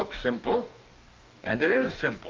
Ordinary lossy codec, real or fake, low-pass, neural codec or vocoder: Opus, 24 kbps; fake; 7.2 kHz; codec, 44.1 kHz, 2.6 kbps, DAC